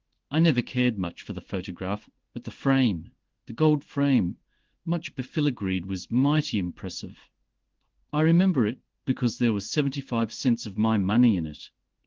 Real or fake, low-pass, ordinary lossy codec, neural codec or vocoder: fake; 7.2 kHz; Opus, 16 kbps; codec, 16 kHz in and 24 kHz out, 1 kbps, XY-Tokenizer